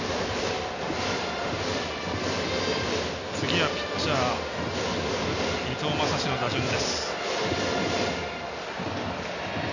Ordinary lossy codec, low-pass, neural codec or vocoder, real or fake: none; 7.2 kHz; none; real